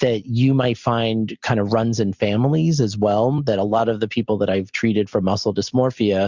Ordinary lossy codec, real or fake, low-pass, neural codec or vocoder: Opus, 64 kbps; real; 7.2 kHz; none